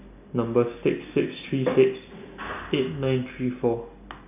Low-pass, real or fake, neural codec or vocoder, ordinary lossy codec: 3.6 kHz; real; none; none